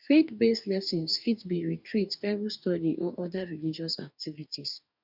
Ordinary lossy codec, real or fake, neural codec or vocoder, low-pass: none; fake; codec, 44.1 kHz, 2.6 kbps, DAC; 5.4 kHz